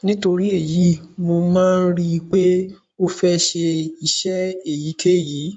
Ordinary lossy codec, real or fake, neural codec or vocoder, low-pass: MP3, 64 kbps; fake; codec, 16 kHz in and 24 kHz out, 2.2 kbps, FireRedTTS-2 codec; 9.9 kHz